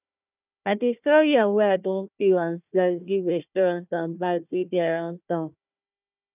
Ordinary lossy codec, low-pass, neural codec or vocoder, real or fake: none; 3.6 kHz; codec, 16 kHz, 1 kbps, FunCodec, trained on Chinese and English, 50 frames a second; fake